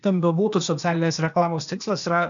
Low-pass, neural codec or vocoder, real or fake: 7.2 kHz; codec, 16 kHz, 0.8 kbps, ZipCodec; fake